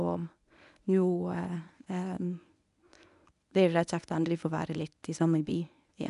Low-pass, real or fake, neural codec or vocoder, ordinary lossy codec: 10.8 kHz; fake; codec, 24 kHz, 0.9 kbps, WavTokenizer, medium speech release version 1; none